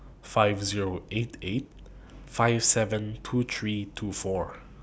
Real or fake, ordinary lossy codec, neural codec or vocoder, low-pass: real; none; none; none